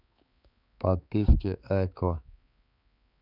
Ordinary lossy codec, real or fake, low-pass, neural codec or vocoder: none; fake; 5.4 kHz; codec, 16 kHz, 4 kbps, X-Codec, HuBERT features, trained on balanced general audio